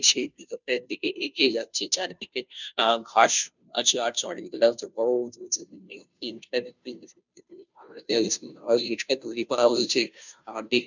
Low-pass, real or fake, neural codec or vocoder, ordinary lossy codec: 7.2 kHz; fake; codec, 16 kHz, 0.5 kbps, FunCodec, trained on Chinese and English, 25 frames a second; none